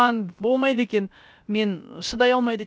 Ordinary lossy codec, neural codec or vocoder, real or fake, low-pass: none; codec, 16 kHz, about 1 kbps, DyCAST, with the encoder's durations; fake; none